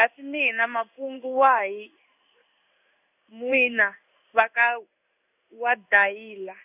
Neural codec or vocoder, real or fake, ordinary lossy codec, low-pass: codec, 16 kHz in and 24 kHz out, 1 kbps, XY-Tokenizer; fake; none; 3.6 kHz